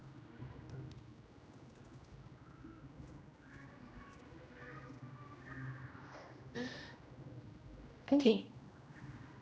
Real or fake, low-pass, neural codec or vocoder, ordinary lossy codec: fake; none; codec, 16 kHz, 1 kbps, X-Codec, HuBERT features, trained on general audio; none